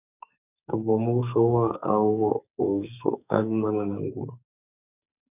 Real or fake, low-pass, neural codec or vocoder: fake; 3.6 kHz; codec, 44.1 kHz, 2.6 kbps, SNAC